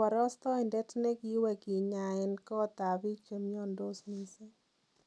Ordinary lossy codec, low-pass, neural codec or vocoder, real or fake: none; none; none; real